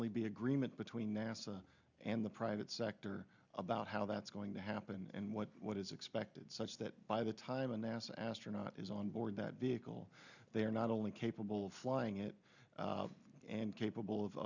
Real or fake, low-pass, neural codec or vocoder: real; 7.2 kHz; none